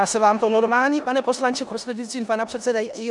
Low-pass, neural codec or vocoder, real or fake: 10.8 kHz; codec, 16 kHz in and 24 kHz out, 0.9 kbps, LongCat-Audio-Codec, four codebook decoder; fake